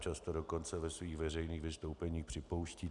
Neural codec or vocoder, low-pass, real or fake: none; 10.8 kHz; real